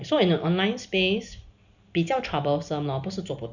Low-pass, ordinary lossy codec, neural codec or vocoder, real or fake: 7.2 kHz; none; none; real